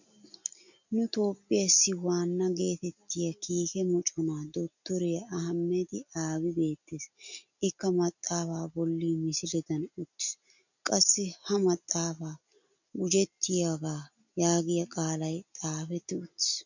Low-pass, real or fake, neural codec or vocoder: 7.2 kHz; real; none